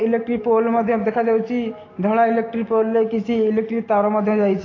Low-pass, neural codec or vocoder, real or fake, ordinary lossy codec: 7.2 kHz; none; real; AAC, 32 kbps